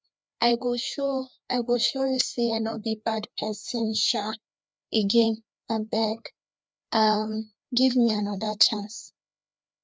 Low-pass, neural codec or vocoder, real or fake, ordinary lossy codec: none; codec, 16 kHz, 2 kbps, FreqCodec, larger model; fake; none